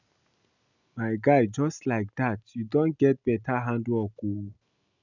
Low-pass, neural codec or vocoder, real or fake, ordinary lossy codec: 7.2 kHz; none; real; none